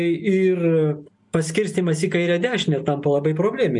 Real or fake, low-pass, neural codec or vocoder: real; 10.8 kHz; none